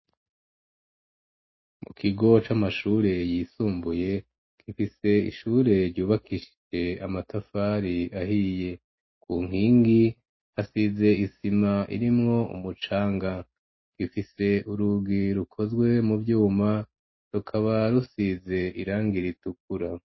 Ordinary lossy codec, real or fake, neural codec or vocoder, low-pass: MP3, 24 kbps; real; none; 7.2 kHz